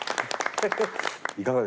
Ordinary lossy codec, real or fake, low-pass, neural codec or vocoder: none; real; none; none